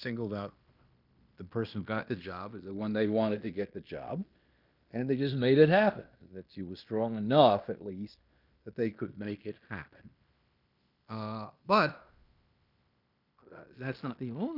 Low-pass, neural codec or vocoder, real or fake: 5.4 kHz; codec, 16 kHz in and 24 kHz out, 0.9 kbps, LongCat-Audio-Codec, fine tuned four codebook decoder; fake